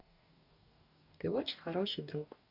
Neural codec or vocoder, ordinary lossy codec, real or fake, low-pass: codec, 44.1 kHz, 2.6 kbps, DAC; none; fake; 5.4 kHz